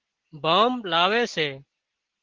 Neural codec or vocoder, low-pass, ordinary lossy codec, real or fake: none; 7.2 kHz; Opus, 16 kbps; real